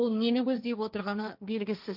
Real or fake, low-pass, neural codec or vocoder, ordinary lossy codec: fake; 5.4 kHz; codec, 16 kHz, 1.1 kbps, Voila-Tokenizer; none